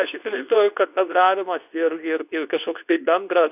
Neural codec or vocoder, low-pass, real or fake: codec, 24 kHz, 0.9 kbps, WavTokenizer, medium speech release version 2; 3.6 kHz; fake